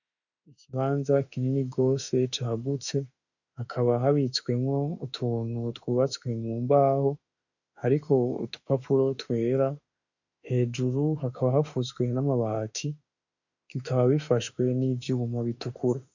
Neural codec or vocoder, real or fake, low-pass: autoencoder, 48 kHz, 32 numbers a frame, DAC-VAE, trained on Japanese speech; fake; 7.2 kHz